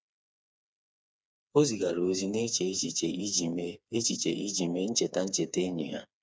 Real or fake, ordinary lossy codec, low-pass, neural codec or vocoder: fake; none; none; codec, 16 kHz, 4 kbps, FreqCodec, smaller model